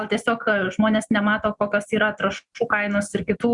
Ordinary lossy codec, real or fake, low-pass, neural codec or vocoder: Opus, 64 kbps; real; 10.8 kHz; none